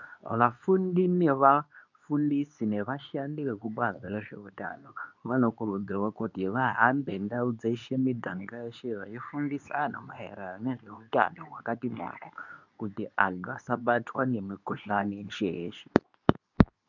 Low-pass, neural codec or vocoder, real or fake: 7.2 kHz; codec, 24 kHz, 0.9 kbps, WavTokenizer, medium speech release version 2; fake